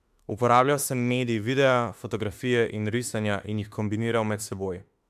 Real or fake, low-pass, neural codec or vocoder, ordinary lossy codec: fake; 14.4 kHz; autoencoder, 48 kHz, 32 numbers a frame, DAC-VAE, trained on Japanese speech; MP3, 96 kbps